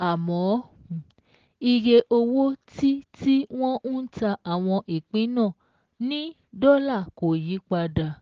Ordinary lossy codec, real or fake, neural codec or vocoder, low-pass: Opus, 16 kbps; real; none; 7.2 kHz